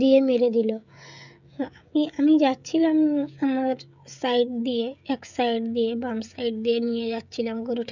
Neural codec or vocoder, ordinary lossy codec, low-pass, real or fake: codec, 16 kHz, 16 kbps, FreqCodec, smaller model; none; 7.2 kHz; fake